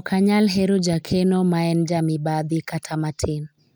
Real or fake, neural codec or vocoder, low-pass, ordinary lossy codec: real; none; none; none